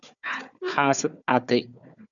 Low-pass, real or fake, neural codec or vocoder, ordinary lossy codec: 7.2 kHz; fake; codec, 16 kHz, 4 kbps, FunCodec, trained on Chinese and English, 50 frames a second; MP3, 96 kbps